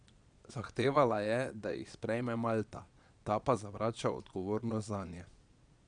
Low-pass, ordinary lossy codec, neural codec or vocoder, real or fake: 9.9 kHz; none; vocoder, 22.05 kHz, 80 mel bands, Vocos; fake